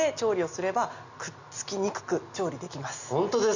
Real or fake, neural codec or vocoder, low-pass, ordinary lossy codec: real; none; 7.2 kHz; Opus, 64 kbps